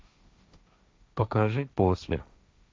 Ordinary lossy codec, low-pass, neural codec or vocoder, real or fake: none; none; codec, 16 kHz, 1.1 kbps, Voila-Tokenizer; fake